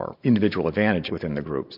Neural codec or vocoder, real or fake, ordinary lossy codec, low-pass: vocoder, 44.1 kHz, 128 mel bands every 512 samples, BigVGAN v2; fake; MP3, 48 kbps; 5.4 kHz